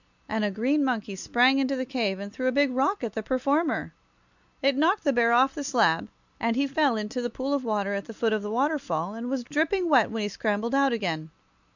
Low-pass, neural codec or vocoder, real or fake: 7.2 kHz; none; real